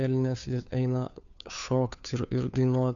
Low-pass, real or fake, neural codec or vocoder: 7.2 kHz; fake; codec, 16 kHz, 2 kbps, FunCodec, trained on Chinese and English, 25 frames a second